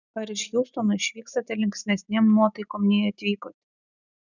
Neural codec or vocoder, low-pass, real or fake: none; 7.2 kHz; real